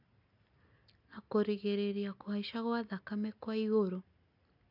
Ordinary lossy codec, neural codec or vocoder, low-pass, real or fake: AAC, 48 kbps; none; 5.4 kHz; real